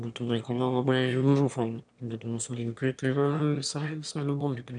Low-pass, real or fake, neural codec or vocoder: 9.9 kHz; fake; autoencoder, 22.05 kHz, a latent of 192 numbers a frame, VITS, trained on one speaker